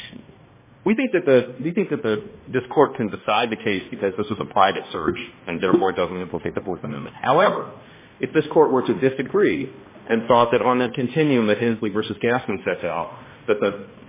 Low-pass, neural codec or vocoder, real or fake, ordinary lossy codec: 3.6 kHz; codec, 16 kHz, 1 kbps, X-Codec, HuBERT features, trained on balanced general audio; fake; MP3, 16 kbps